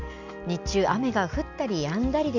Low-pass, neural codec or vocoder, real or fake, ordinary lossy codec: 7.2 kHz; none; real; none